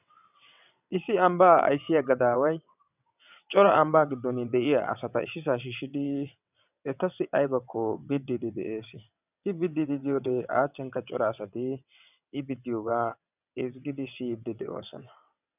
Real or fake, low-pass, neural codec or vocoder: fake; 3.6 kHz; vocoder, 22.05 kHz, 80 mel bands, WaveNeXt